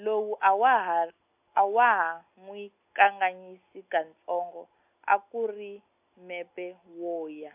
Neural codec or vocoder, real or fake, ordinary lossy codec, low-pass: none; real; AAC, 32 kbps; 3.6 kHz